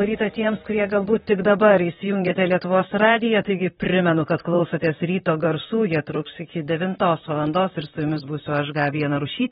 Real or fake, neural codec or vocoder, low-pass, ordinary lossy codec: fake; vocoder, 44.1 kHz, 128 mel bands, Pupu-Vocoder; 19.8 kHz; AAC, 16 kbps